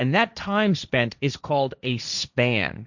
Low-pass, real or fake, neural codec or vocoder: 7.2 kHz; fake; codec, 16 kHz, 1.1 kbps, Voila-Tokenizer